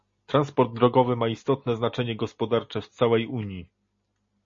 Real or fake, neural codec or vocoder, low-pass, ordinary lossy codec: real; none; 7.2 kHz; MP3, 32 kbps